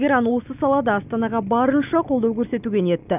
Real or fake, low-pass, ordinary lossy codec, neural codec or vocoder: real; 3.6 kHz; none; none